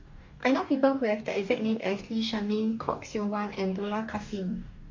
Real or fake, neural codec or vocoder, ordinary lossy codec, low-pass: fake; codec, 44.1 kHz, 2.6 kbps, DAC; MP3, 64 kbps; 7.2 kHz